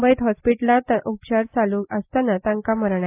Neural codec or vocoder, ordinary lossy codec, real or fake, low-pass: none; AAC, 24 kbps; real; 3.6 kHz